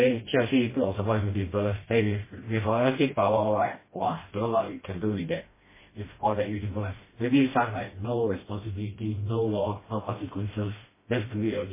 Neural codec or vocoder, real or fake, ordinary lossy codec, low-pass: codec, 16 kHz, 1 kbps, FreqCodec, smaller model; fake; MP3, 16 kbps; 3.6 kHz